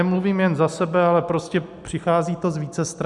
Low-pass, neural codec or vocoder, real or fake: 10.8 kHz; none; real